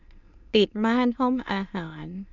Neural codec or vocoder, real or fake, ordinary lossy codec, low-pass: autoencoder, 22.05 kHz, a latent of 192 numbers a frame, VITS, trained on many speakers; fake; none; 7.2 kHz